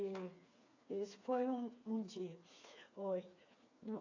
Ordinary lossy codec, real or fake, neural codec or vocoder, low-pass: AAC, 48 kbps; fake; codec, 16 kHz, 4 kbps, FreqCodec, smaller model; 7.2 kHz